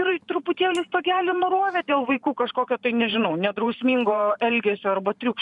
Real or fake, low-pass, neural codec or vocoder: fake; 10.8 kHz; vocoder, 44.1 kHz, 128 mel bands every 512 samples, BigVGAN v2